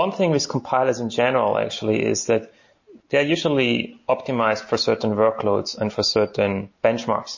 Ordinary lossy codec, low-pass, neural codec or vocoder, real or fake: MP3, 32 kbps; 7.2 kHz; none; real